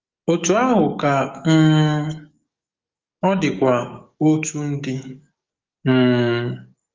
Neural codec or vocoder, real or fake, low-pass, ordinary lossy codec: codec, 44.1 kHz, 7.8 kbps, DAC; fake; 7.2 kHz; Opus, 32 kbps